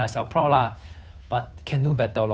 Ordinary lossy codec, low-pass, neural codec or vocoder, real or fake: none; none; codec, 16 kHz, 2 kbps, FunCodec, trained on Chinese and English, 25 frames a second; fake